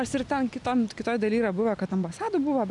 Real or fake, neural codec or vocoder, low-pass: real; none; 10.8 kHz